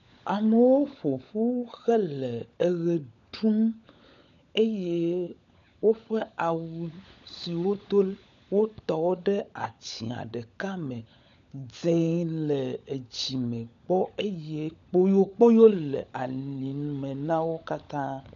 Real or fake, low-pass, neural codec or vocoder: fake; 7.2 kHz; codec, 16 kHz, 16 kbps, FunCodec, trained on LibriTTS, 50 frames a second